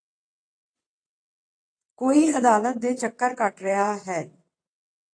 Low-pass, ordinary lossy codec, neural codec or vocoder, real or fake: 9.9 kHz; AAC, 48 kbps; vocoder, 22.05 kHz, 80 mel bands, WaveNeXt; fake